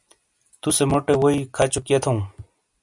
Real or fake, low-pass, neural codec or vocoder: real; 10.8 kHz; none